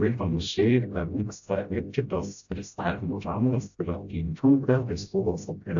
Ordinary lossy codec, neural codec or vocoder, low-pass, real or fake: AAC, 64 kbps; codec, 16 kHz, 0.5 kbps, FreqCodec, smaller model; 7.2 kHz; fake